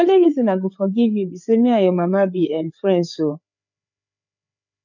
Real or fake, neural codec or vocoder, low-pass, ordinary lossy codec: fake; codec, 16 kHz, 4 kbps, FreqCodec, larger model; 7.2 kHz; none